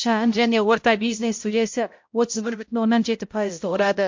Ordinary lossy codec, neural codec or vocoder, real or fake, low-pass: MP3, 48 kbps; codec, 16 kHz, 0.5 kbps, X-Codec, HuBERT features, trained on balanced general audio; fake; 7.2 kHz